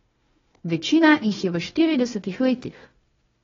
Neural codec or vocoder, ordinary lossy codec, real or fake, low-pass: codec, 16 kHz, 1 kbps, FunCodec, trained on Chinese and English, 50 frames a second; AAC, 24 kbps; fake; 7.2 kHz